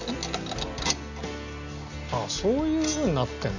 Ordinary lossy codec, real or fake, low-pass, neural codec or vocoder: none; real; 7.2 kHz; none